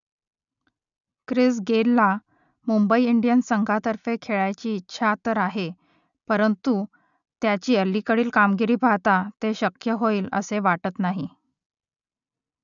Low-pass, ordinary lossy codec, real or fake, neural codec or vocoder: 7.2 kHz; none; real; none